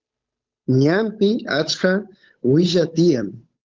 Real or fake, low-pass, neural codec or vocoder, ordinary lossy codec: fake; 7.2 kHz; codec, 16 kHz, 8 kbps, FunCodec, trained on Chinese and English, 25 frames a second; Opus, 24 kbps